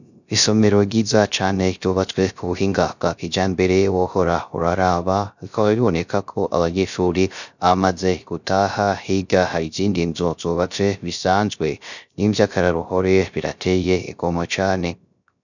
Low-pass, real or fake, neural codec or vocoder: 7.2 kHz; fake; codec, 16 kHz, 0.3 kbps, FocalCodec